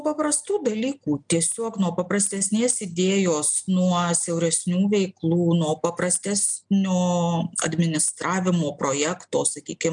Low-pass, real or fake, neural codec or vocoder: 9.9 kHz; real; none